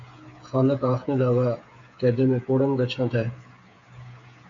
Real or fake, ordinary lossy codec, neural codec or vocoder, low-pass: fake; MP3, 48 kbps; codec, 16 kHz, 8 kbps, FreqCodec, smaller model; 7.2 kHz